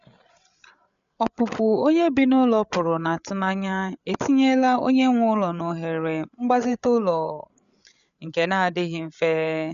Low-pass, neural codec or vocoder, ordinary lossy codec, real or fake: 7.2 kHz; codec, 16 kHz, 8 kbps, FreqCodec, larger model; none; fake